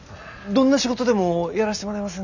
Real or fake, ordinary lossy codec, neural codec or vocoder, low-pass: real; none; none; 7.2 kHz